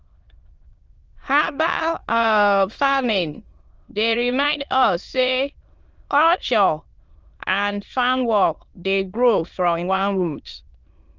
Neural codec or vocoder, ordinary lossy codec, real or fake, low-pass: autoencoder, 22.05 kHz, a latent of 192 numbers a frame, VITS, trained on many speakers; Opus, 24 kbps; fake; 7.2 kHz